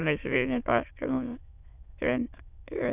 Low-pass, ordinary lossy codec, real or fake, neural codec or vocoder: 3.6 kHz; none; fake; autoencoder, 22.05 kHz, a latent of 192 numbers a frame, VITS, trained on many speakers